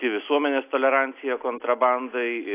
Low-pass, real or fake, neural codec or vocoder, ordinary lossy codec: 3.6 kHz; real; none; AAC, 24 kbps